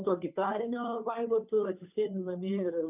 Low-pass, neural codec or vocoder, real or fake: 3.6 kHz; codec, 16 kHz, 2 kbps, FunCodec, trained on Chinese and English, 25 frames a second; fake